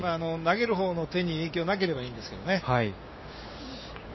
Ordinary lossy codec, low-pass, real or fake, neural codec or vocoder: MP3, 24 kbps; 7.2 kHz; fake; codec, 16 kHz, 6 kbps, DAC